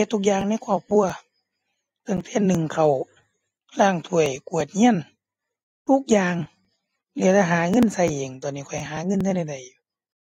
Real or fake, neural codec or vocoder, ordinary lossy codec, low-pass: fake; vocoder, 44.1 kHz, 128 mel bands every 256 samples, BigVGAN v2; AAC, 48 kbps; 19.8 kHz